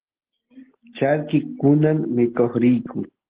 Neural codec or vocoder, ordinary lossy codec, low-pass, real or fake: none; Opus, 16 kbps; 3.6 kHz; real